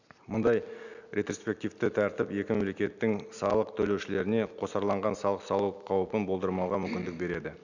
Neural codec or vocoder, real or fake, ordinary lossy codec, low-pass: none; real; none; 7.2 kHz